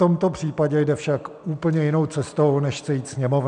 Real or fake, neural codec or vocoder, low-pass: real; none; 9.9 kHz